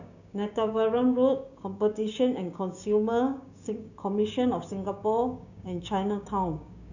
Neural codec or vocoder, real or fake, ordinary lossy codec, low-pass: codec, 16 kHz, 6 kbps, DAC; fake; none; 7.2 kHz